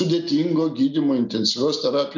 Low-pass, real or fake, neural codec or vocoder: 7.2 kHz; real; none